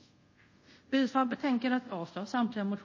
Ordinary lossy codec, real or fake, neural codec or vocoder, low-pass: MP3, 32 kbps; fake; codec, 24 kHz, 0.5 kbps, DualCodec; 7.2 kHz